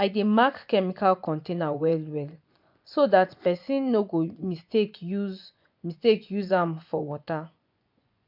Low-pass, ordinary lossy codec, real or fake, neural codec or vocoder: 5.4 kHz; MP3, 48 kbps; fake; vocoder, 24 kHz, 100 mel bands, Vocos